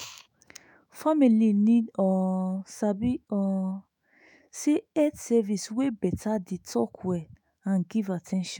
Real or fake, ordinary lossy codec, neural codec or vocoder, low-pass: fake; none; autoencoder, 48 kHz, 128 numbers a frame, DAC-VAE, trained on Japanese speech; 19.8 kHz